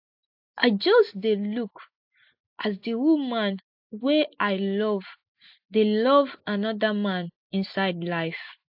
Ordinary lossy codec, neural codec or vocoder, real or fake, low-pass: AAC, 48 kbps; none; real; 5.4 kHz